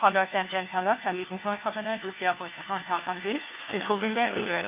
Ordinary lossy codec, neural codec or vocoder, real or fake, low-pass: Opus, 24 kbps; codec, 16 kHz, 1 kbps, FunCodec, trained on LibriTTS, 50 frames a second; fake; 3.6 kHz